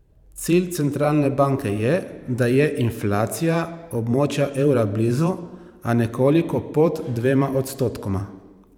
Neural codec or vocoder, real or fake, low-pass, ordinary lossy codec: vocoder, 44.1 kHz, 128 mel bands every 512 samples, BigVGAN v2; fake; 19.8 kHz; none